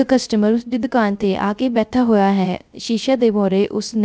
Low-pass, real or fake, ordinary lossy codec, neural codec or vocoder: none; fake; none; codec, 16 kHz, 0.3 kbps, FocalCodec